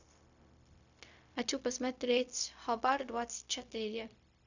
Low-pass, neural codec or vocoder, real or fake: 7.2 kHz; codec, 16 kHz, 0.4 kbps, LongCat-Audio-Codec; fake